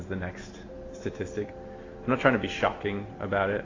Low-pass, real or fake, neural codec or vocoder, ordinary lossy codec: 7.2 kHz; real; none; AAC, 32 kbps